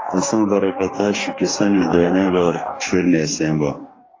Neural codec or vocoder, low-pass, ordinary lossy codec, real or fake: codec, 44.1 kHz, 2.6 kbps, DAC; 7.2 kHz; AAC, 32 kbps; fake